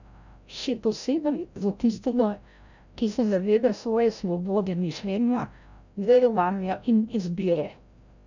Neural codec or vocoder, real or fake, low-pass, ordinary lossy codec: codec, 16 kHz, 0.5 kbps, FreqCodec, larger model; fake; 7.2 kHz; none